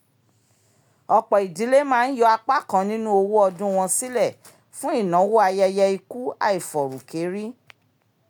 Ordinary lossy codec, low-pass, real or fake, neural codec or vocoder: none; none; real; none